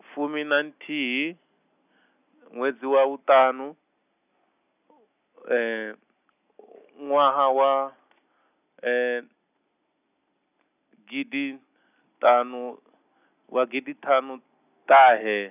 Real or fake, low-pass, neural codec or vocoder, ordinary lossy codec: real; 3.6 kHz; none; none